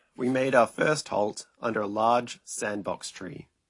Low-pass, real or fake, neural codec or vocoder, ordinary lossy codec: 10.8 kHz; real; none; AAC, 48 kbps